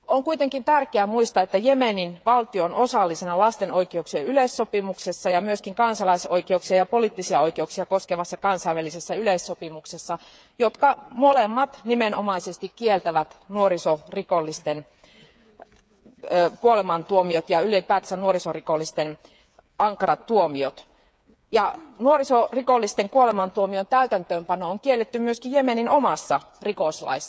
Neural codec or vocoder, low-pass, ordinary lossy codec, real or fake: codec, 16 kHz, 8 kbps, FreqCodec, smaller model; none; none; fake